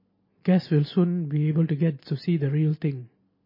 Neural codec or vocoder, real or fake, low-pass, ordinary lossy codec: none; real; 5.4 kHz; MP3, 24 kbps